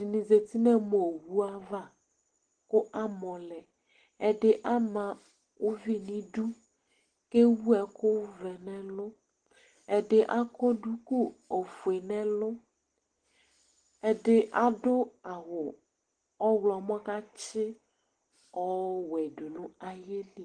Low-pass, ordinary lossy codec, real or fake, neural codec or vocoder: 9.9 kHz; Opus, 16 kbps; real; none